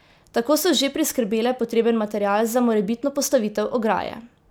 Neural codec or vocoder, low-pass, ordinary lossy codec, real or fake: none; none; none; real